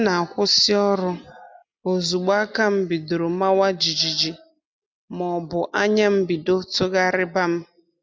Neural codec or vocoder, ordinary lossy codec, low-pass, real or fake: none; none; none; real